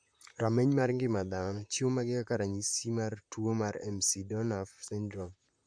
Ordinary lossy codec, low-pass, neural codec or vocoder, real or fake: AAC, 64 kbps; 9.9 kHz; vocoder, 44.1 kHz, 128 mel bands, Pupu-Vocoder; fake